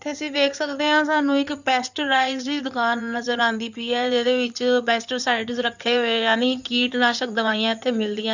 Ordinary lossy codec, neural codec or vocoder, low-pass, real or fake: none; codec, 16 kHz in and 24 kHz out, 2.2 kbps, FireRedTTS-2 codec; 7.2 kHz; fake